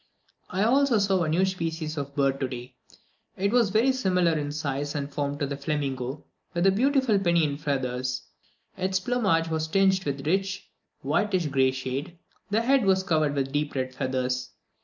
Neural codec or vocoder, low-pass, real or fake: none; 7.2 kHz; real